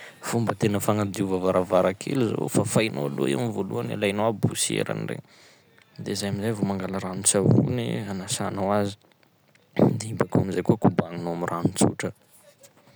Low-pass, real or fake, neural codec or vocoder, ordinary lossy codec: none; real; none; none